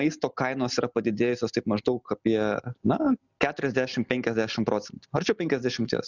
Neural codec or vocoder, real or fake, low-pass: none; real; 7.2 kHz